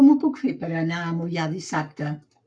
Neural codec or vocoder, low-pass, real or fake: codec, 44.1 kHz, 7.8 kbps, Pupu-Codec; 9.9 kHz; fake